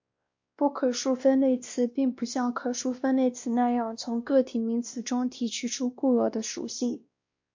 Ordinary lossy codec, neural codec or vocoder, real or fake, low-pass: MP3, 48 kbps; codec, 16 kHz, 1 kbps, X-Codec, WavLM features, trained on Multilingual LibriSpeech; fake; 7.2 kHz